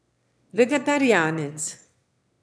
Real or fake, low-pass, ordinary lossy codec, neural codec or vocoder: fake; none; none; autoencoder, 22.05 kHz, a latent of 192 numbers a frame, VITS, trained on one speaker